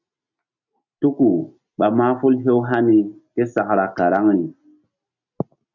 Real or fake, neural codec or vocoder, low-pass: real; none; 7.2 kHz